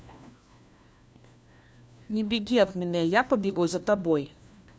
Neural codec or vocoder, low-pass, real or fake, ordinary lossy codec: codec, 16 kHz, 1 kbps, FunCodec, trained on LibriTTS, 50 frames a second; none; fake; none